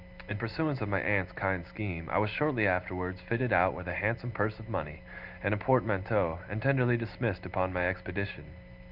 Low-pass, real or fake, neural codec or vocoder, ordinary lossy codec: 5.4 kHz; real; none; Opus, 32 kbps